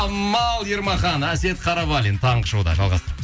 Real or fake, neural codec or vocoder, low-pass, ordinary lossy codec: real; none; none; none